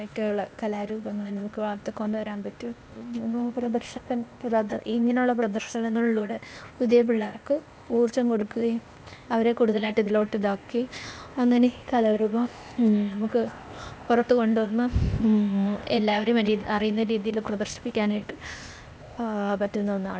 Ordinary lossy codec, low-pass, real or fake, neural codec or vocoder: none; none; fake; codec, 16 kHz, 0.8 kbps, ZipCodec